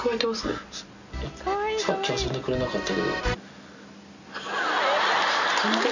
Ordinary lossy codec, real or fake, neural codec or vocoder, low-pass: none; real; none; 7.2 kHz